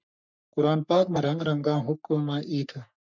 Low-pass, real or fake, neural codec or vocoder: 7.2 kHz; fake; codec, 44.1 kHz, 3.4 kbps, Pupu-Codec